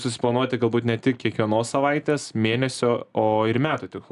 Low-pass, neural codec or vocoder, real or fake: 10.8 kHz; none; real